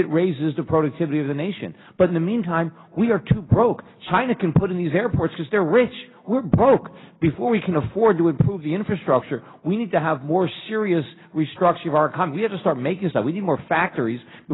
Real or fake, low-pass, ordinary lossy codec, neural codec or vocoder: real; 7.2 kHz; AAC, 16 kbps; none